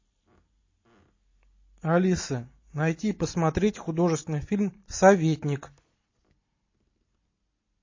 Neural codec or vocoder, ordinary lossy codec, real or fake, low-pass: none; MP3, 32 kbps; real; 7.2 kHz